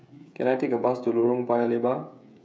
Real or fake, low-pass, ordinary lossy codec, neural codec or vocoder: fake; none; none; codec, 16 kHz, 16 kbps, FreqCodec, smaller model